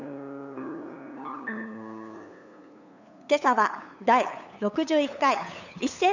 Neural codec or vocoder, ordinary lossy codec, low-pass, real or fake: codec, 16 kHz, 8 kbps, FunCodec, trained on LibriTTS, 25 frames a second; none; 7.2 kHz; fake